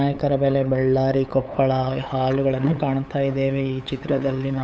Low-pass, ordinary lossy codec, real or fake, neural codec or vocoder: none; none; fake; codec, 16 kHz, 8 kbps, FunCodec, trained on LibriTTS, 25 frames a second